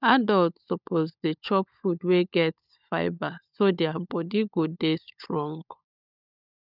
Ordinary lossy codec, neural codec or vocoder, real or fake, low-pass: none; codec, 16 kHz, 16 kbps, FunCodec, trained on LibriTTS, 50 frames a second; fake; 5.4 kHz